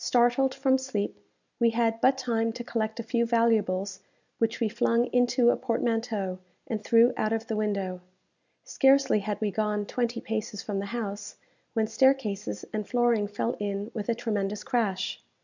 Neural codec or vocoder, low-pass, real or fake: none; 7.2 kHz; real